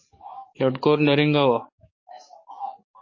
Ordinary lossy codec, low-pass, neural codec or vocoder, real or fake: MP3, 32 kbps; 7.2 kHz; codec, 44.1 kHz, 7.8 kbps, DAC; fake